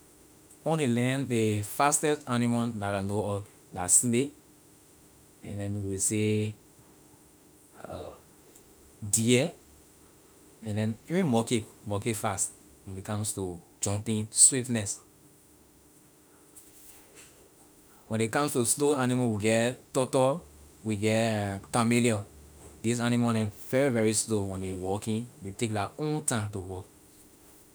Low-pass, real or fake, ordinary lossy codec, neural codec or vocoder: none; fake; none; autoencoder, 48 kHz, 32 numbers a frame, DAC-VAE, trained on Japanese speech